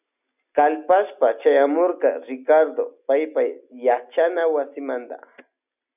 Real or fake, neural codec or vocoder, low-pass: real; none; 3.6 kHz